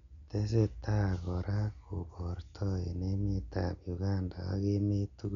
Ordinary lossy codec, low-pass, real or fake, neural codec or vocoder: none; 7.2 kHz; real; none